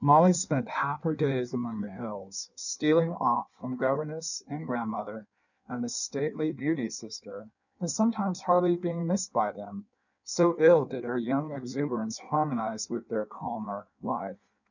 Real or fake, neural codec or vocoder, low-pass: fake; codec, 16 kHz in and 24 kHz out, 1.1 kbps, FireRedTTS-2 codec; 7.2 kHz